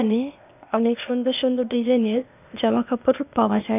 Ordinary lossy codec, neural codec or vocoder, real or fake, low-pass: none; codec, 16 kHz, 0.8 kbps, ZipCodec; fake; 3.6 kHz